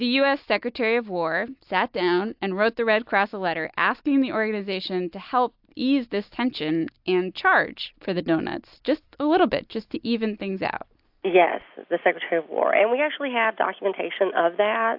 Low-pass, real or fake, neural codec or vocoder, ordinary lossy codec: 5.4 kHz; real; none; AAC, 48 kbps